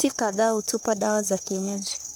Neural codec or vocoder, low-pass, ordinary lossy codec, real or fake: codec, 44.1 kHz, 3.4 kbps, Pupu-Codec; none; none; fake